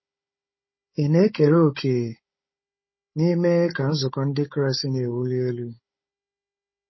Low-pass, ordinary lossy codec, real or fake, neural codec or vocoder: 7.2 kHz; MP3, 24 kbps; fake; codec, 16 kHz, 16 kbps, FunCodec, trained on Chinese and English, 50 frames a second